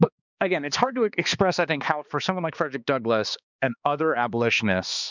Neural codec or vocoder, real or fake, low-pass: codec, 16 kHz, 2 kbps, X-Codec, HuBERT features, trained on balanced general audio; fake; 7.2 kHz